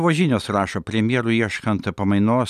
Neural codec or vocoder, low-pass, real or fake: none; 14.4 kHz; real